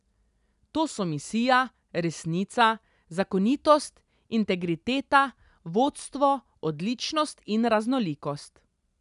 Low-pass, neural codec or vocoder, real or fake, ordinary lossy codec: 10.8 kHz; none; real; none